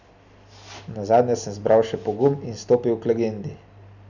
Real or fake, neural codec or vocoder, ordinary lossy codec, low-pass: real; none; none; 7.2 kHz